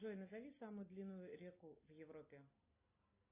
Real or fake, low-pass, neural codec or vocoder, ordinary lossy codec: real; 3.6 kHz; none; AAC, 24 kbps